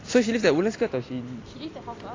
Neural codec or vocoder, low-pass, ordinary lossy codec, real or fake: none; 7.2 kHz; AAC, 32 kbps; real